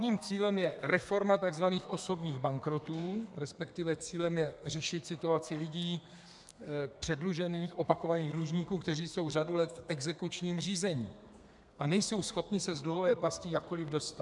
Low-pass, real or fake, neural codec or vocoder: 10.8 kHz; fake; codec, 32 kHz, 1.9 kbps, SNAC